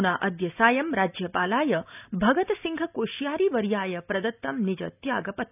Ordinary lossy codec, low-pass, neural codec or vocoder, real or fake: none; 3.6 kHz; none; real